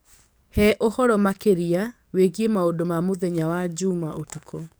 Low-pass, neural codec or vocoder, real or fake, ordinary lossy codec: none; codec, 44.1 kHz, 7.8 kbps, DAC; fake; none